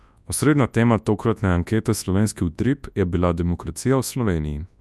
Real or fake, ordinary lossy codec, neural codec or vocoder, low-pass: fake; none; codec, 24 kHz, 0.9 kbps, WavTokenizer, large speech release; none